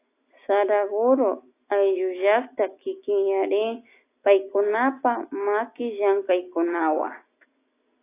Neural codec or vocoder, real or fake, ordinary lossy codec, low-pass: none; real; AAC, 24 kbps; 3.6 kHz